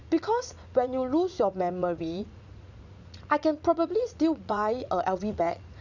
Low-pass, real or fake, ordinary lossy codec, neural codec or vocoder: 7.2 kHz; fake; none; vocoder, 44.1 kHz, 80 mel bands, Vocos